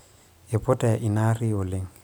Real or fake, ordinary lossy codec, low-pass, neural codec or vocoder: real; none; none; none